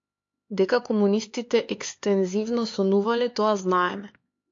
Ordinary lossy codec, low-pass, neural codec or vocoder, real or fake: AAC, 48 kbps; 7.2 kHz; codec, 16 kHz, 4 kbps, X-Codec, HuBERT features, trained on LibriSpeech; fake